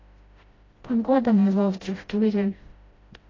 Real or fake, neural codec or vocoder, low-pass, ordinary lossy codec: fake; codec, 16 kHz, 0.5 kbps, FreqCodec, smaller model; 7.2 kHz; AAC, 32 kbps